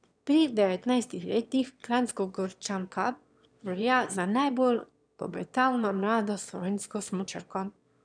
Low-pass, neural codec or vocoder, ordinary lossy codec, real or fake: 9.9 kHz; autoencoder, 22.05 kHz, a latent of 192 numbers a frame, VITS, trained on one speaker; none; fake